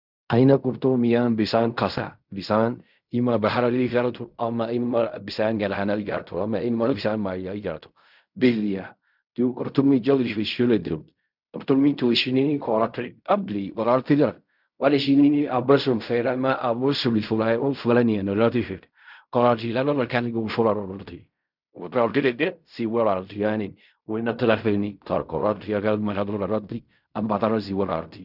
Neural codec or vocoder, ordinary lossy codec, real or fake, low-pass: codec, 16 kHz in and 24 kHz out, 0.4 kbps, LongCat-Audio-Codec, fine tuned four codebook decoder; none; fake; 5.4 kHz